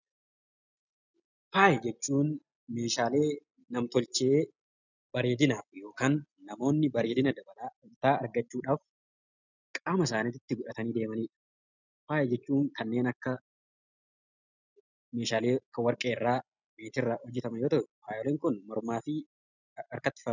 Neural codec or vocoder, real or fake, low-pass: none; real; 7.2 kHz